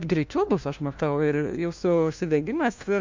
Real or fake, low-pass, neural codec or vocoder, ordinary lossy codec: fake; 7.2 kHz; codec, 16 kHz, 1 kbps, FunCodec, trained on LibriTTS, 50 frames a second; MP3, 64 kbps